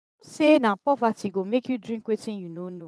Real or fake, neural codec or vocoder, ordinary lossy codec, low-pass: fake; vocoder, 22.05 kHz, 80 mel bands, Vocos; none; none